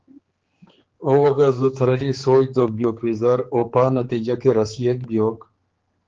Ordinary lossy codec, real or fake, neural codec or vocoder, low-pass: Opus, 16 kbps; fake; codec, 16 kHz, 4 kbps, X-Codec, HuBERT features, trained on general audio; 7.2 kHz